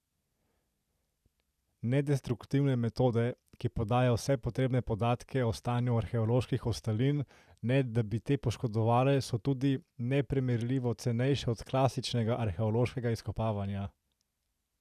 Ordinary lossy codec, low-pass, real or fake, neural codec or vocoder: none; 14.4 kHz; real; none